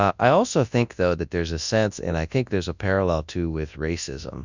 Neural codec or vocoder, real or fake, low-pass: codec, 24 kHz, 0.9 kbps, WavTokenizer, large speech release; fake; 7.2 kHz